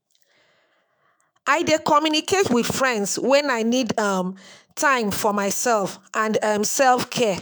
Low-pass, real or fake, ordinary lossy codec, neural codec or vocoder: none; fake; none; autoencoder, 48 kHz, 128 numbers a frame, DAC-VAE, trained on Japanese speech